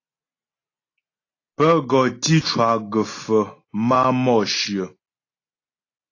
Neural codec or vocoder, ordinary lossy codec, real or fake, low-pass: none; AAC, 32 kbps; real; 7.2 kHz